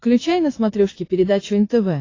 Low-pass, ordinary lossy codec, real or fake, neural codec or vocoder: 7.2 kHz; AAC, 32 kbps; fake; vocoder, 22.05 kHz, 80 mel bands, Vocos